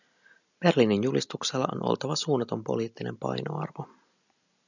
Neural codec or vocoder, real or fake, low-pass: none; real; 7.2 kHz